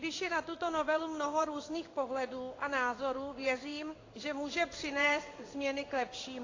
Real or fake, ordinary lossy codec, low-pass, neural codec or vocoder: fake; AAC, 32 kbps; 7.2 kHz; codec, 16 kHz in and 24 kHz out, 1 kbps, XY-Tokenizer